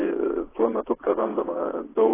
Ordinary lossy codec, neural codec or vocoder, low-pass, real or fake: AAC, 16 kbps; vocoder, 22.05 kHz, 80 mel bands, WaveNeXt; 3.6 kHz; fake